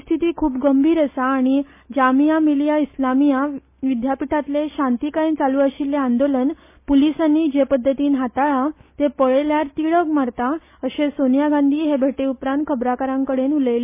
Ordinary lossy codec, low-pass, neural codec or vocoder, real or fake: MP3, 24 kbps; 3.6 kHz; none; real